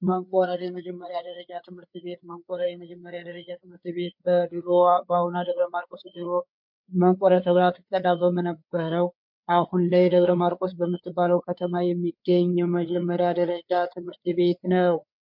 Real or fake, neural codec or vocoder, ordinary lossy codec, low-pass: fake; codec, 16 kHz, 4 kbps, FreqCodec, larger model; MP3, 48 kbps; 5.4 kHz